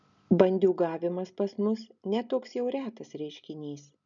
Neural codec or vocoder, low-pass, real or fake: none; 7.2 kHz; real